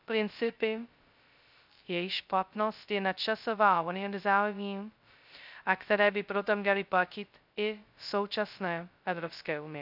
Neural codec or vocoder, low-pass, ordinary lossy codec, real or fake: codec, 16 kHz, 0.2 kbps, FocalCodec; 5.4 kHz; none; fake